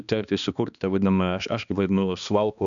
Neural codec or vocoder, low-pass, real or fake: codec, 16 kHz, 2 kbps, X-Codec, HuBERT features, trained on balanced general audio; 7.2 kHz; fake